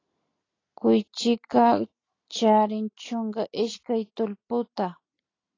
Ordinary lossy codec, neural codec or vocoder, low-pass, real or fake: AAC, 32 kbps; none; 7.2 kHz; real